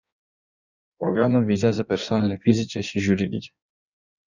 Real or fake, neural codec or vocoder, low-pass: fake; codec, 16 kHz in and 24 kHz out, 1.1 kbps, FireRedTTS-2 codec; 7.2 kHz